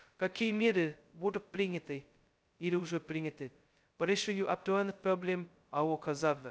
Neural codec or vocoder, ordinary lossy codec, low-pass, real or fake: codec, 16 kHz, 0.2 kbps, FocalCodec; none; none; fake